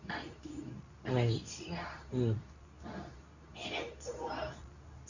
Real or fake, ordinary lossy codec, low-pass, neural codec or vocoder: fake; none; 7.2 kHz; codec, 24 kHz, 0.9 kbps, WavTokenizer, medium speech release version 2